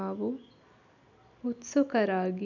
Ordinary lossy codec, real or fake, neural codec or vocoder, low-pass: none; real; none; 7.2 kHz